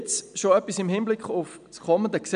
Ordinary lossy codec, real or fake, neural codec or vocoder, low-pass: none; real; none; 9.9 kHz